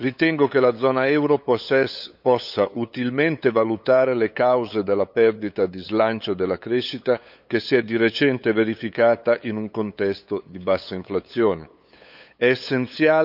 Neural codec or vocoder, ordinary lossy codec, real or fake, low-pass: codec, 16 kHz, 8 kbps, FunCodec, trained on LibriTTS, 25 frames a second; none; fake; 5.4 kHz